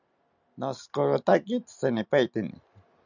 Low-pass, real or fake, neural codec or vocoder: 7.2 kHz; real; none